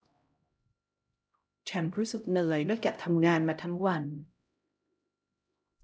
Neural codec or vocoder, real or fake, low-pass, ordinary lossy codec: codec, 16 kHz, 0.5 kbps, X-Codec, HuBERT features, trained on LibriSpeech; fake; none; none